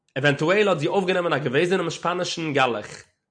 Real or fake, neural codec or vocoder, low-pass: real; none; 9.9 kHz